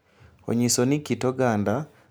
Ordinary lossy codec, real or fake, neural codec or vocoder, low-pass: none; real; none; none